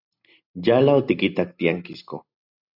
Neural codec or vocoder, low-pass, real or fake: none; 5.4 kHz; real